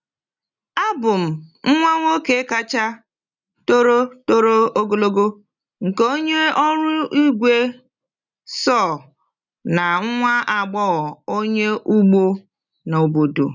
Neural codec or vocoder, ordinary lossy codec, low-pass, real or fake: none; none; 7.2 kHz; real